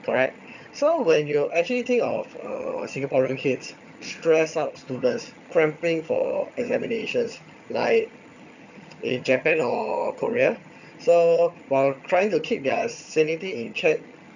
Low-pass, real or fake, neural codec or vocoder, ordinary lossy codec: 7.2 kHz; fake; vocoder, 22.05 kHz, 80 mel bands, HiFi-GAN; none